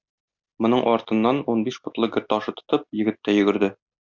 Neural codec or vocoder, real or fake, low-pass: none; real; 7.2 kHz